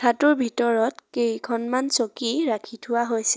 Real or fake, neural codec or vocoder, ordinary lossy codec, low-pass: real; none; none; none